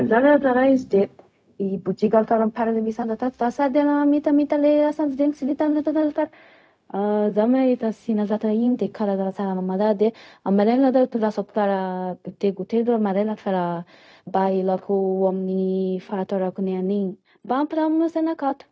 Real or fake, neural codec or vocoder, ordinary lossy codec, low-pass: fake; codec, 16 kHz, 0.4 kbps, LongCat-Audio-Codec; none; none